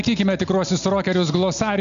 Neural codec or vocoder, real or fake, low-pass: none; real; 7.2 kHz